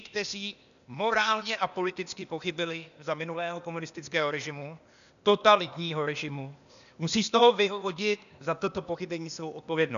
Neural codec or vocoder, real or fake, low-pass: codec, 16 kHz, 0.8 kbps, ZipCodec; fake; 7.2 kHz